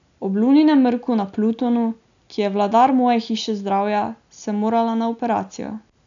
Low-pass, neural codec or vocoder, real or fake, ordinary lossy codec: 7.2 kHz; none; real; none